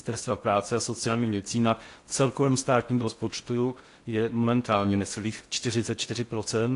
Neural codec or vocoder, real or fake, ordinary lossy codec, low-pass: codec, 16 kHz in and 24 kHz out, 0.6 kbps, FocalCodec, streaming, 4096 codes; fake; AAC, 48 kbps; 10.8 kHz